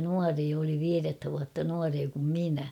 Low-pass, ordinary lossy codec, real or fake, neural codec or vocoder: 19.8 kHz; none; real; none